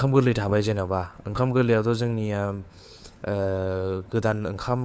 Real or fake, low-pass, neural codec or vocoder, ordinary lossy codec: fake; none; codec, 16 kHz, 4 kbps, FunCodec, trained on LibriTTS, 50 frames a second; none